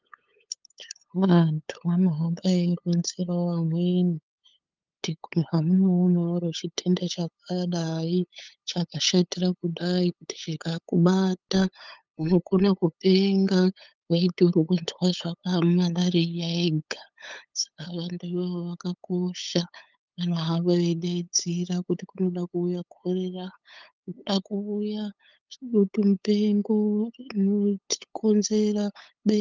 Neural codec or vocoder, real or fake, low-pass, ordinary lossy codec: codec, 16 kHz, 8 kbps, FunCodec, trained on LibriTTS, 25 frames a second; fake; 7.2 kHz; Opus, 24 kbps